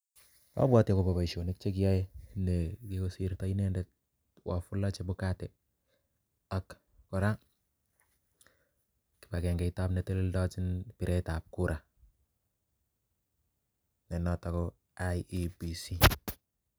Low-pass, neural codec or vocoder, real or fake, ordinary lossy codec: none; none; real; none